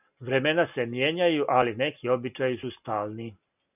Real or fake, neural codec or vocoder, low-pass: real; none; 3.6 kHz